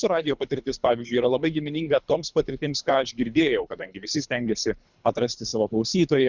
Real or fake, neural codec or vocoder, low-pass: fake; codec, 24 kHz, 3 kbps, HILCodec; 7.2 kHz